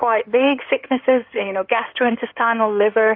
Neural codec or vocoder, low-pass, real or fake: codec, 16 kHz in and 24 kHz out, 2.2 kbps, FireRedTTS-2 codec; 5.4 kHz; fake